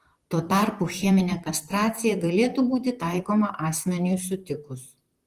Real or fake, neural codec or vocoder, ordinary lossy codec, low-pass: fake; vocoder, 44.1 kHz, 128 mel bands, Pupu-Vocoder; Opus, 24 kbps; 14.4 kHz